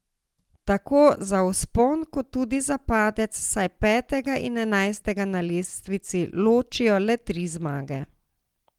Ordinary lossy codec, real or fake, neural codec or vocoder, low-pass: Opus, 24 kbps; real; none; 19.8 kHz